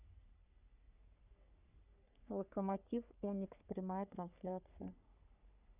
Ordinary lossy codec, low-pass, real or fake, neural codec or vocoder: none; 3.6 kHz; fake; codec, 44.1 kHz, 3.4 kbps, Pupu-Codec